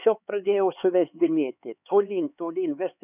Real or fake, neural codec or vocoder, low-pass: fake; codec, 16 kHz, 4 kbps, X-Codec, HuBERT features, trained on LibriSpeech; 3.6 kHz